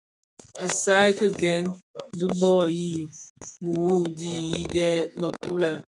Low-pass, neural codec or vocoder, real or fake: 10.8 kHz; codec, 32 kHz, 1.9 kbps, SNAC; fake